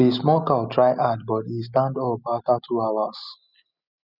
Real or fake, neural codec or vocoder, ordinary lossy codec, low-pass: real; none; none; 5.4 kHz